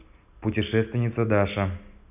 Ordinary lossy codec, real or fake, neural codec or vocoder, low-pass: none; real; none; 3.6 kHz